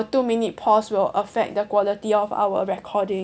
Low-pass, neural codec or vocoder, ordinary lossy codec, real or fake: none; none; none; real